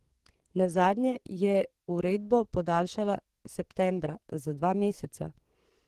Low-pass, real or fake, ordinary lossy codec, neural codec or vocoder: 14.4 kHz; fake; Opus, 16 kbps; codec, 44.1 kHz, 2.6 kbps, SNAC